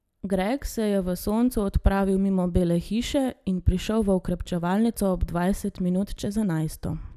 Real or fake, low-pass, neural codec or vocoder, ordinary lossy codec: real; 14.4 kHz; none; none